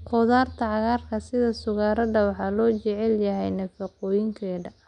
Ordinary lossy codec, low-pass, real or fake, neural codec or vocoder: none; 9.9 kHz; real; none